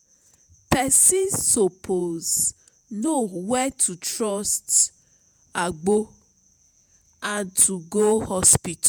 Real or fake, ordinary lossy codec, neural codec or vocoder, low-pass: fake; none; vocoder, 48 kHz, 128 mel bands, Vocos; none